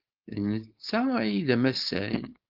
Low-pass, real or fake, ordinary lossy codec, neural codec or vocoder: 5.4 kHz; fake; Opus, 24 kbps; codec, 16 kHz, 4.8 kbps, FACodec